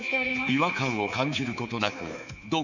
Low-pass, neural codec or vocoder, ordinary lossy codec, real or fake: 7.2 kHz; codec, 24 kHz, 3.1 kbps, DualCodec; none; fake